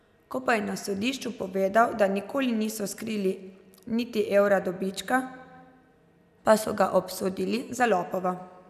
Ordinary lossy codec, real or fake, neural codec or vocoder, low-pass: none; real; none; 14.4 kHz